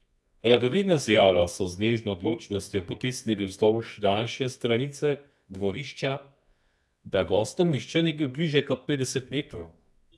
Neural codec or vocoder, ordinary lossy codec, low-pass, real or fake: codec, 24 kHz, 0.9 kbps, WavTokenizer, medium music audio release; none; none; fake